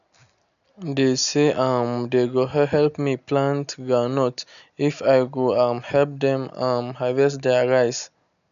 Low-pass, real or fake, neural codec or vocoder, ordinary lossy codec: 7.2 kHz; real; none; none